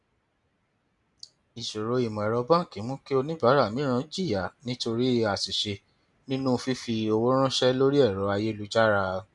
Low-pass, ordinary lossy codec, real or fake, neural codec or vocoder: 10.8 kHz; none; real; none